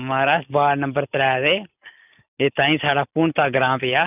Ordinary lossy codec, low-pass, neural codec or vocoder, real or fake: none; 3.6 kHz; none; real